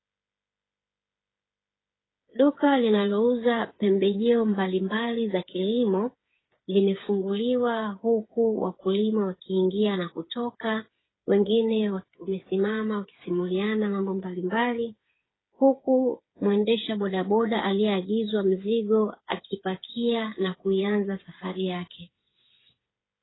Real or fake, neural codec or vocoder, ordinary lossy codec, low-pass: fake; codec, 16 kHz, 8 kbps, FreqCodec, smaller model; AAC, 16 kbps; 7.2 kHz